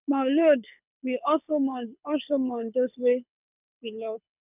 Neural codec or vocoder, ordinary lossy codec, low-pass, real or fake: codec, 24 kHz, 6 kbps, HILCodec; none; 3.6 kHz; fake